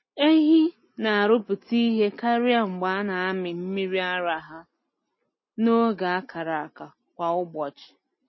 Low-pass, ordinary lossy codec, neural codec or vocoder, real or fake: 7.2 kHz; MP3, 24 kbps; none; real